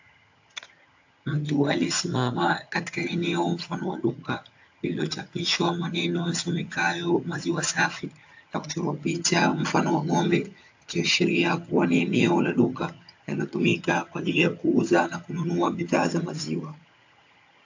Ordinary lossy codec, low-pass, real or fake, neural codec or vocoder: AAC, 48 kbps; 7.2 kHz; fake; vocoder, 22.05 kHz, 80 mel bands, HiFi-GAN